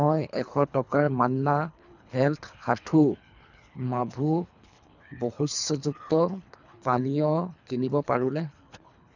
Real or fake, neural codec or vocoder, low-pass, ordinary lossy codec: fake; codec, 24 kHz, 3 kbps, HILCodec; 7.2 kHz; none